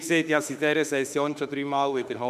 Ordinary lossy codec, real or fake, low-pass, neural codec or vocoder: none; fake; 14.4 kHz; autoencoder, 48 kHz, 32 numbers a frame, DAC-VAE, trained on Japanese speech